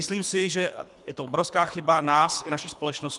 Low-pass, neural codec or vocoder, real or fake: 10.8 kHz; codec, 24 kHz, 3 kbps, HILCodec; fake